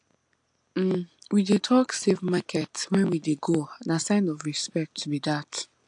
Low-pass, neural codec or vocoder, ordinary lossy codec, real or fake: 9.9 kHz; none; AAC, 64 kbps; real